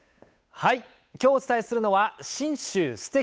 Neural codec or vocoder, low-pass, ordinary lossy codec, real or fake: codec, 16 kHz, 8 kbps, FunCodec, trained on Chinese and English, 25 frames a second; none; none; fake